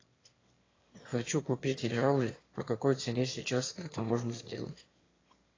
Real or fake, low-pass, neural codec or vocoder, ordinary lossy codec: fake; 7.2 kHz; autoencoder, 22.05 kHz, a latent of 192 numbers a frame, VITS, trained on one speaker; AAC, 32 kbps